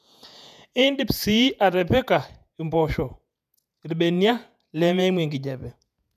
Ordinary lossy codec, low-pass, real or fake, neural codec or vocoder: none; 14.4 kHz; fake; vocoder, 48 kHz, 128 mel bands, Vocos